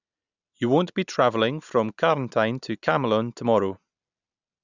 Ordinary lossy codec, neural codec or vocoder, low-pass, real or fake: none; none; 7.2 kHz; real